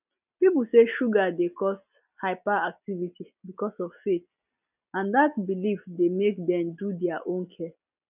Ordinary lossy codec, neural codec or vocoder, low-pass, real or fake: none; none; 3.6 kHz; real